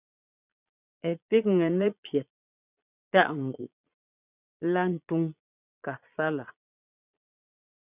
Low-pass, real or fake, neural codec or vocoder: 3.6 kHz; fake; vocoder, 22.05 kHz, 80 mel bands, Vocos